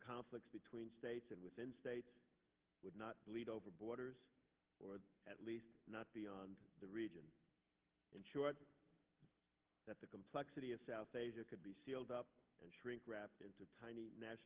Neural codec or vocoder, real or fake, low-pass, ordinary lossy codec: none; real; 3.6 kHz; Opus, 16 kbps